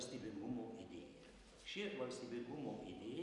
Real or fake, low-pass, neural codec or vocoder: real; 10.8 kHz; none